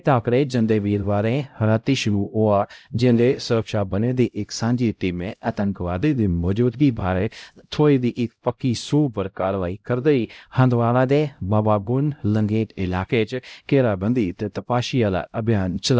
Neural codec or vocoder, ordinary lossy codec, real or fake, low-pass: codec, 16 kHz, 0.5 kbps, X-Codec, HuBERT features, trained on LibriSpeech; none; fake; none